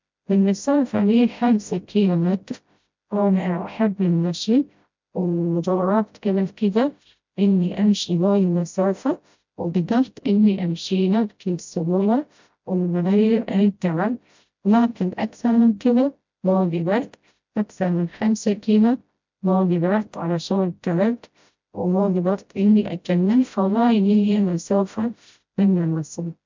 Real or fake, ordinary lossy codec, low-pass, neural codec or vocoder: fake; MP3, 64 kbps; 7.2 kHz; codec, 16 kHz, 0.5 kbps, FreqCodec, smaller model